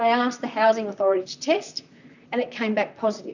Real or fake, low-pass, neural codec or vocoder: fake; 7.2 kHz; vocoder, 44.1 kHz, 128 mel bands, Pupu-Vocoder